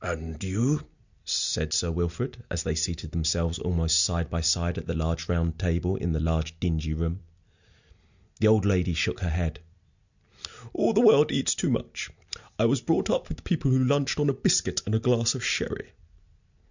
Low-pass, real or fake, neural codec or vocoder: 7.2 kHz; real; none